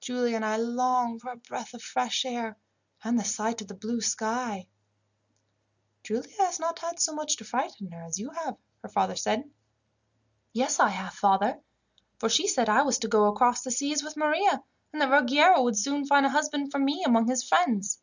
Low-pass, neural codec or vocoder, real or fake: 7.2 kHz; none; real